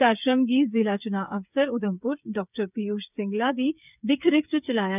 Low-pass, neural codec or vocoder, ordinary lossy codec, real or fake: 3.6 kHz; codec, 16 kHz, 8 kbps, FreqCodec, smaller model; none; fake